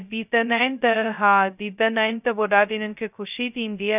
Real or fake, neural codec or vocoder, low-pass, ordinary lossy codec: fake; codec, 16 kHz, 0.2 kbps, FocalCodec; 3.6 kHz; none